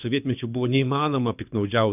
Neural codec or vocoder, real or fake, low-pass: codec, 24 kHz, 6 kbps, HILCodec; fake; 3.6 kHz